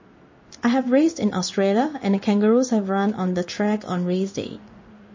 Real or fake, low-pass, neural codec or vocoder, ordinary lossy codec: fake; 7.2 kHz; codec, 16 kHz in and 24 kHz out, 1 kbps, XY-Tokenizer; MP3, 32 kbps